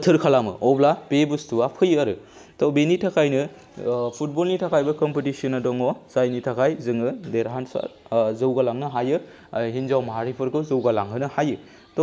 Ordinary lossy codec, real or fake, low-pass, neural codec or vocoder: none; real; none; none